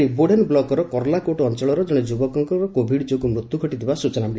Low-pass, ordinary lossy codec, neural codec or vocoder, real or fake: none; none; none; real